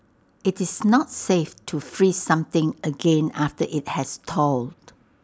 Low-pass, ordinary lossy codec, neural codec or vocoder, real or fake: none; none; none; real